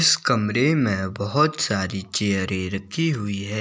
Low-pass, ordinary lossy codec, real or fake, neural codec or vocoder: none; none; real; none